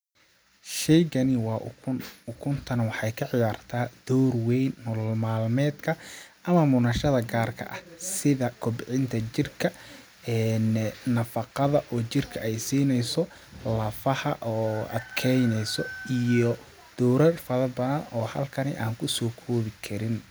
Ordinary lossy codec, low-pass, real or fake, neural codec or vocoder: none; none; real; none